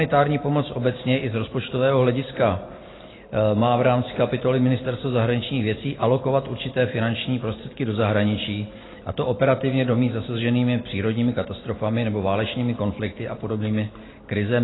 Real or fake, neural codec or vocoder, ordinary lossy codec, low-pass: real; none; AAC, 16 kbps; 7.2 kHz